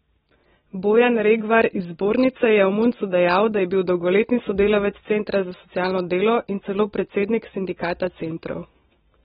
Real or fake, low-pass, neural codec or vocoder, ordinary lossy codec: real; 14.4 kHz; none; AAC, 16 kbps